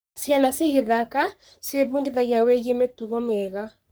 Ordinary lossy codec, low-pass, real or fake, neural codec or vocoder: none; none; fake; codec, 44.1 kHz, 3.4 kbps, Pupu-Codec